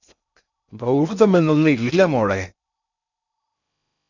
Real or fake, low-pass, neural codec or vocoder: fake; 7.2 kHz; codec, 16 kHz in and 24 kHz out, 0.6 kbps, FocalCodec, streaming, 2048 codes